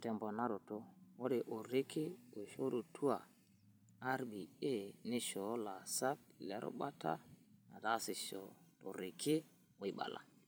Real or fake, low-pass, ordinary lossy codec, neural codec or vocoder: fake; none; none; vocoder, 44.1 kHz, 128 mel bands every 512 samples, BigVGAN v2